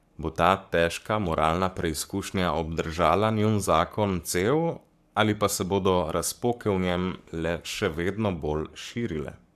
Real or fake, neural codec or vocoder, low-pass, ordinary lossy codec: fake; codec, 44.1 kHz, 7.8 kbps, Pupu-Codec; 14.4 kHz; AAC, 96 kbps